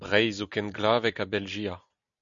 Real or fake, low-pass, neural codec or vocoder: real; 7.2 kHz; none